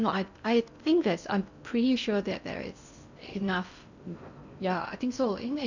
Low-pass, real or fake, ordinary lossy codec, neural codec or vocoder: 7.2 kHz; fake; none; codec, 16 kHz in and 24 kHz out, 0.6 kbps, FocalCodec, streaming, 4096 codes